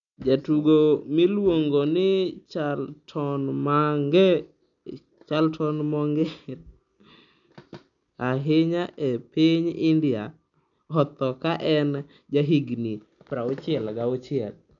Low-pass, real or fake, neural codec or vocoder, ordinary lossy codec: 7.2 kHz; real; none; none